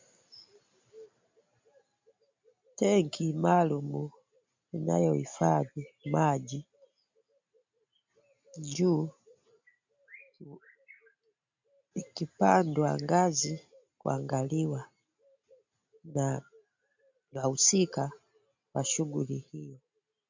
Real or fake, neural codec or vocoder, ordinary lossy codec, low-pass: real; none; MP3, 64 kbps; 7.2 kHz